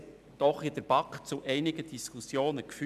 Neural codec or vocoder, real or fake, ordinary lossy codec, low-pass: none; real; none; 14.4 kHz